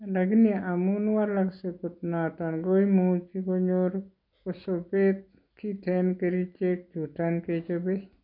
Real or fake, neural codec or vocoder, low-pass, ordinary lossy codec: real; none; 5.4 kHz; none